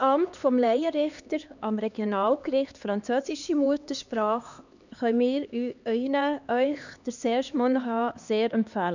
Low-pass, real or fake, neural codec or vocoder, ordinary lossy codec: 7.2 kHz; fake; codec, 16 kHz, 2 kbps, X-Codec, HuBERT features, trained on LibriSpeech; none